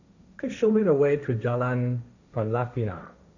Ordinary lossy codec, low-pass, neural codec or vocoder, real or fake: none; 7.2 kHz; codec, 16 kHz, 1.1 kbps, Voila-Tokenizer; fake